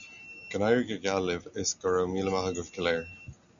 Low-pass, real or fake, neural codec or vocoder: 7.2 kHz; real; none